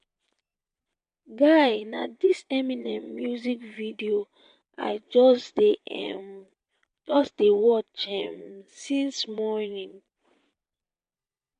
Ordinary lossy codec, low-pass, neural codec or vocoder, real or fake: AAC, 64 kbps; 9.9 kHz; vocoder, 22.05 kHz, 80 mel bands, Vocos; fake